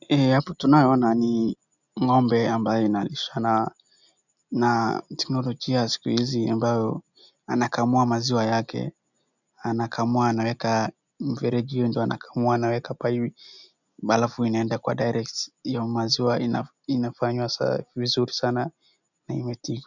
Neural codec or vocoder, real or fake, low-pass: none; real; 7.2 kHz